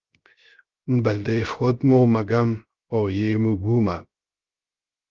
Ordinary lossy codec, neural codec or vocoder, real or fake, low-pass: Opus, 32 kbps; codec, 16 kHz, 0.3 kbps, FocalCodec; fake; 7.2 kHz